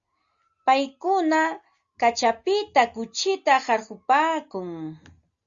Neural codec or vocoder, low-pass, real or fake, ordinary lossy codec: none; 7.2 kHz; real; Opus, 64 kbps